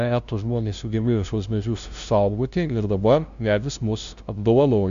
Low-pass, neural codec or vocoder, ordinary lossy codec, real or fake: 7.2 kHz; codec, 16 kHz, 0.5 kbps, FunCodec, trained on LibriTTS, 25 frames a second; Opus, 64 kbps; fake